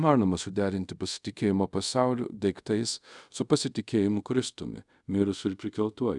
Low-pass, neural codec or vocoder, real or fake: 10.8 kHz; codec, 24 kHz, 0.5 kbps, DualCodec; fake